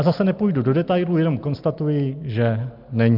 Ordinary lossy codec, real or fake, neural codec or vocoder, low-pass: Opus, 32 kbps; real; none; 5.4 kHz